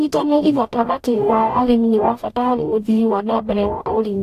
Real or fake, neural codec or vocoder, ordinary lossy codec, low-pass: fake; codec, 44.1 kHz, 0.9 kbps, DAC; AAC, 64 kbps; 14.4 kHz